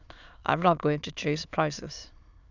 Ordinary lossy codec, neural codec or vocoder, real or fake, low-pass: none; autoencoder, 22.05 kHz, a latent of 192 numbers a frame, VITS, trained on many speakers; fake; 7.2 kHz